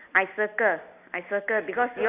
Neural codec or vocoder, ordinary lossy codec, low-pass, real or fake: none; none; 3.6 kHz; real